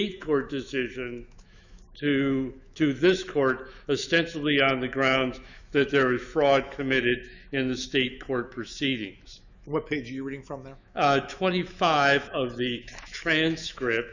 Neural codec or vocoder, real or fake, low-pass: autoencoder, 48 kHz, 128 numbers a frame, DAC-VAE, trained on Japanese speech; fake; 7.2 kHz